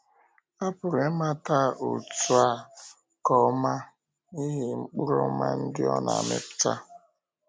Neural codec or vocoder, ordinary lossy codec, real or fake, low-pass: none; none; real; none